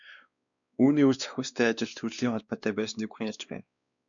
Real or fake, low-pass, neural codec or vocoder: fake; 7.2 kHz; codec, 16 kHz, 2 kbps, X-Codec, WavLM features, trained on Multilingual LibriSpeech